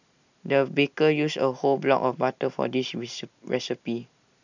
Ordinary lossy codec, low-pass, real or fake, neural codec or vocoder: none; 7.2 kHz; real; none